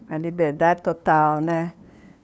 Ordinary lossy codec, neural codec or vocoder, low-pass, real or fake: none; codec, 16 kHz, 2 kbps, FunCodec, trained on LibriTTS, 25 frames a second; none; fake